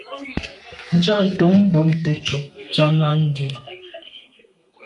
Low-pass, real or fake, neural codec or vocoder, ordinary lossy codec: 10.8 kHz; fake; codec, 32 kHz, 1.9 kbps, SNAC; MP3, 64 kbps